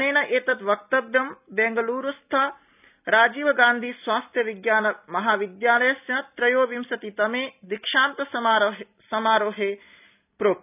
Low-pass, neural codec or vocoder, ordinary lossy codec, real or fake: 3.6 kHz; none; none; real